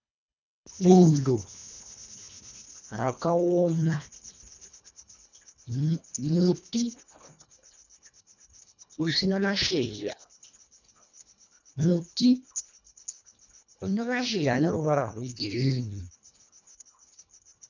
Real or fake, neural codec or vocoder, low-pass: fake; codec, 24 kHz, 1.5 kbps, HILCodec; 7.2 kHz